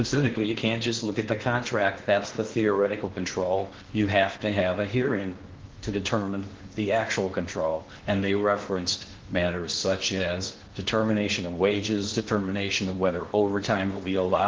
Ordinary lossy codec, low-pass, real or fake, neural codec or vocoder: Opus, 16 kbps; 7.2 kHz; fake; codec, 16 kHz in and 24 kHz out, 0.8 kbps, FocalCodec, streaming, 65536 codes